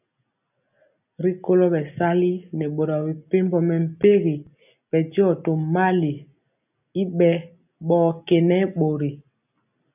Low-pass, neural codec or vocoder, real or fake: 3.6 kHz; none; real